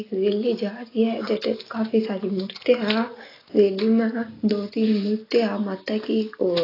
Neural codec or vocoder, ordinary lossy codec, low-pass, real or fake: none; AAC, 24 kbps; 5.4 kHz; real